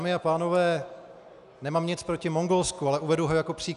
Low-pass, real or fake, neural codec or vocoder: 10.8 kHz; real; none